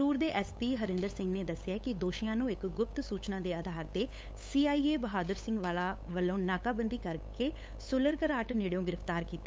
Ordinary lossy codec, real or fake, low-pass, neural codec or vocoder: none; fake; none; codec, 16 kHz, 8 kbps, FunCodec, trained on LibriTTS, 25 frames a second